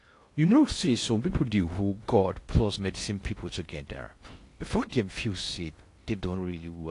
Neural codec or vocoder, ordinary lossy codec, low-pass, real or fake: codec, 16 kHz in and 24 kHz out, 0.6 kbps, FocalCodec, streaming, 2048 codes; AAC, 48 kbps; 10.8 kHz; fake